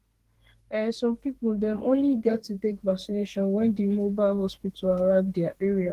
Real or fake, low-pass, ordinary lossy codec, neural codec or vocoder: fake; 14.4 kHz; Opus, 16 kbps; codec, 32 kHz, 1.9 kbps, SNAC